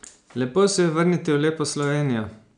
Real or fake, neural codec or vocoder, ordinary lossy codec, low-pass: real; none; none; 9.9 kHz